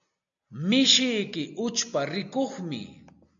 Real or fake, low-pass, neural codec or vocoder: real; 7.2 kHz; none